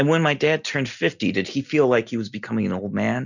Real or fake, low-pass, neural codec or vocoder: real; 7.2 kHz; none